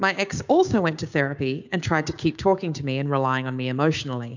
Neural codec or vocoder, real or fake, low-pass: codec, 16 kHz, 6 kbps, DAC; fake; 7.2 kHz